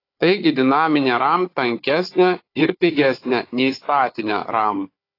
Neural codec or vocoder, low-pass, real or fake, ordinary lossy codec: codec, 16 kHz, 4 kbps, FunCodec, trained on Chinese and English, 50 frames a second; 5.4 kHz; fake; AAC, 32 kbps